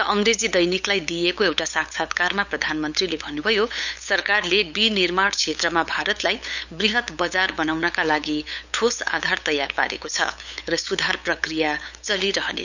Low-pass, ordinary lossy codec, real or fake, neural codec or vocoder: 7.2 kHz; none; fake; codec, 16 kHz, 8 kbps, FunCodec, trained on LibriTTS, 25 frames a second